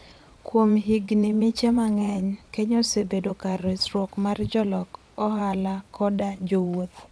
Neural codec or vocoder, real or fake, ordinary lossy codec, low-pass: vocoder, 22.05 kHz, 80 mel bands, Vocos; fake; none; none